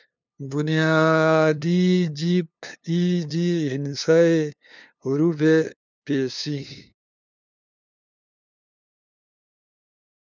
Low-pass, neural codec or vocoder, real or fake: 7.2 kHz; codec, 16 kHz, 2 kbps, FunCodec, trained on LibriTTS, 25 frames a second; fake